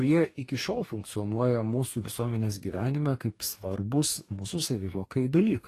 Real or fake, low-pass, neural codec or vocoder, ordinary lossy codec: fake; 14.4 kHz; codec, 44.1 kHz, 2.6 kbps, DAC; AAC, 48 kbps